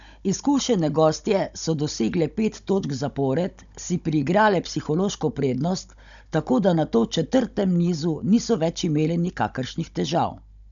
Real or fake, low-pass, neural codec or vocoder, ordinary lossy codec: fake; 7.2 kHz; codec, 16 kHz, 16 kbps, FunCodec, trained on Chinese and English, 50 frames a second; none